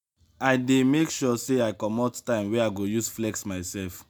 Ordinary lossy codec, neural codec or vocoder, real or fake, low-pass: none; none; real; none